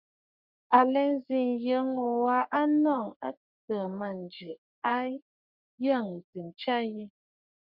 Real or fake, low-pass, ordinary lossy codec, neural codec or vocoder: fake; 5.4 kHz; Opus, 64 kbps; codec, 44.1 kHz, 3.4 kbps, Pupu-Codec